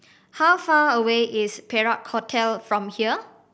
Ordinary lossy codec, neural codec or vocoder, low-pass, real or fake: none; none; none; real